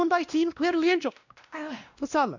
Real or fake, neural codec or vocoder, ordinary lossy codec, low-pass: fake; codec, 16 kHz, 1 kbps, X-Codec, WavLM features, trained on Multilingual LibriSpeech; none; 7.2 kHz